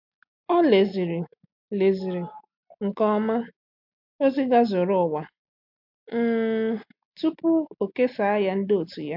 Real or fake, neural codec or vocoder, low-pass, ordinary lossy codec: real; none; 5.4 kHz; MP3, 48 kbps